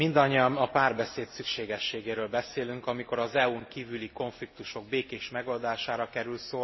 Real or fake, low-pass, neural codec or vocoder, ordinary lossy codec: real; 7.2 kHz; none; MP3, 24 kbps